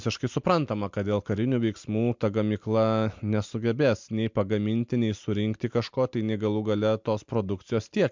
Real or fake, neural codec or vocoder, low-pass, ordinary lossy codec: real; none; 7.2 kHz; MP3, 64 kbps